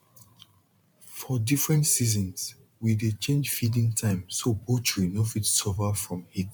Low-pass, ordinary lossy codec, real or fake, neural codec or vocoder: 19.8 kHz; MP3, 96 kbps; fake; vocoder, 44.1 kHz, 128 mel bands every 512 samples, BigVGAN v2